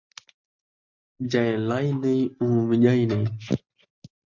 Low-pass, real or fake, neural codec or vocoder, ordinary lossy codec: 7.2 kHz; real; none; MP3, 64 kbps